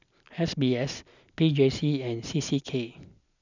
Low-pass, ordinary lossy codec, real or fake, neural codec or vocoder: 7.2 kHz; none; real; none